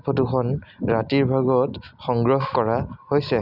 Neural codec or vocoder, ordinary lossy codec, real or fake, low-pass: none; none; real; 5.4 kHz